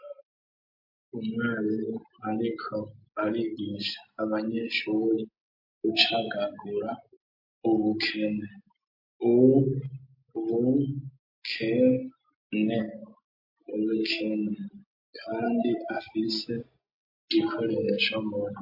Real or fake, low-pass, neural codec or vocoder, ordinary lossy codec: real; 5.4 kHz; none; MP3, 32 kbps